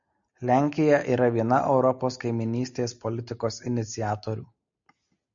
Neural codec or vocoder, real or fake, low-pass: none; real; 7.2 kHz